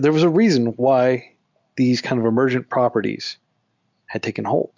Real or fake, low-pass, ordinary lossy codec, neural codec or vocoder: real; 7.2 kHz; MP3, 64 kbps; none